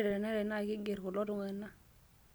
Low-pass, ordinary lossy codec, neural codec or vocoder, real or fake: none; none; none; real